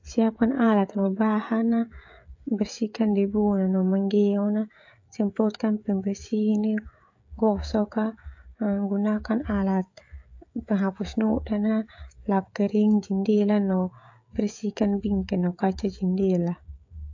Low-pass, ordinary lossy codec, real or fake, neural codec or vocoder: 7.2 kHz; AAC, 48 kbps; fake; codec, 16 kHz, 16 kbps, FreqCodec, smaller model